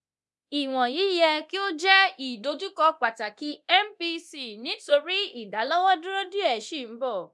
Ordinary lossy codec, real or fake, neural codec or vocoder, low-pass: none; fake; codec, 24 kHz, 0.9 kbps, DualCodec; none